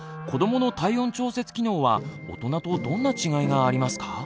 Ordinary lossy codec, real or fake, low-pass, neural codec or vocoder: none; real; none; none